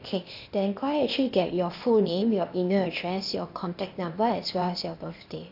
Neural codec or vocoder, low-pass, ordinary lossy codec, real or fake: codec, 16 kHz, 0.8 kbps, ZipCodec; 5.4 kHz; AAC, 48 kbps; fake